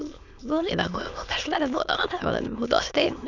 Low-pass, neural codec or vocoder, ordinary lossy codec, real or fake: 7.2 kHz; autoencoder, 22.05 kHz, a latent of 192 numbers a frame, VITS, trained on many speakers; none; fake